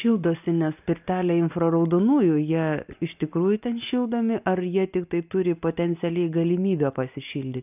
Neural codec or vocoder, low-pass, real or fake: none; 3.6 kHz; real